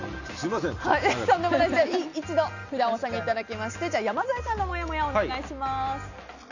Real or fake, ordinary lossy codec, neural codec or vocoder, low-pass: real; none; none; 7.2 kHz